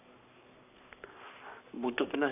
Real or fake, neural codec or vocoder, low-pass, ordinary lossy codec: fake; codec, 44.1 kHz, 7.8 kbps, Pupu-Codec; 3.6 kHz; none